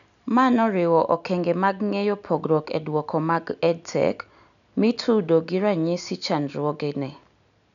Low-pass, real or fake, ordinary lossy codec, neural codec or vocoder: 7.2 kHz; real; none; none